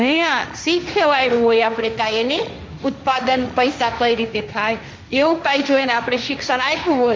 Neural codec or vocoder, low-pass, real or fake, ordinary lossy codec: codec, 16 kHz, 1.1 kbps, Voila-Tokenizer; none; fake; none